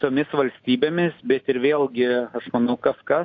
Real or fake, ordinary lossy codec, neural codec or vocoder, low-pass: real; AAC, 48 kbps; none; 7.2 kHz